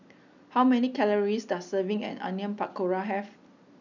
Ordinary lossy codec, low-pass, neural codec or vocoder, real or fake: none; 7.2 kHz; none; real